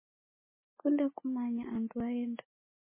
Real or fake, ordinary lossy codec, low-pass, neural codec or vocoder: real; MP3, 16 kbps; 3.6 kHz; none